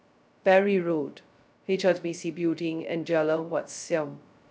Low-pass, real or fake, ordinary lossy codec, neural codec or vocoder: none; fake; none; codec, 16 kHz, 0.2 kbps, FocalCodec